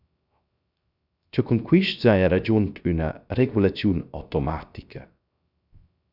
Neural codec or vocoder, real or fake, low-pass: codec, 16 kHz, 0.3 kbps, FocalCodec; fake; 5.4 kHz